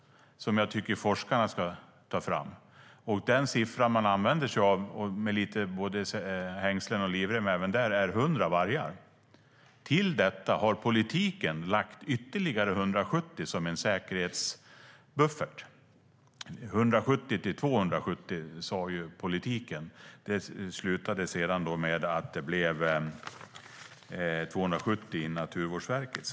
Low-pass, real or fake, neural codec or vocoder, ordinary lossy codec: none; real; none; none